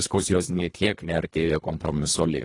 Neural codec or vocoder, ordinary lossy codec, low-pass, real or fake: codec, 24 kHz, 1.5 kbps, HILCodec; AAC, 32 kbps; 10.8 kHz; fake